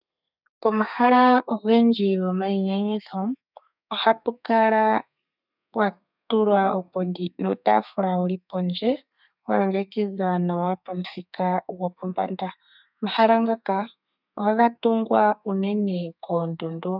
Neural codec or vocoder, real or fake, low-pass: codec, 32 kHz, 1.9 kbps, SNAC; fake; 5.4 kHz